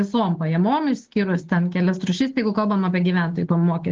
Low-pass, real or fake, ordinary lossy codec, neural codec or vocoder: 7.2 kHz; real; Opus, 16 kbps; none